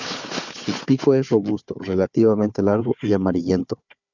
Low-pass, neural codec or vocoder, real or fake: 7.2 kHz; codec, 16 kHz, 4 kbps, FunCodec, trained on Chinese and English, 50 frames a second; fake